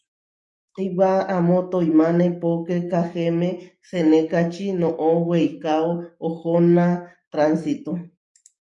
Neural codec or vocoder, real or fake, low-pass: codec, 44.1 kHz, 7.8 kbps, DAC; fake; 10.8 kHz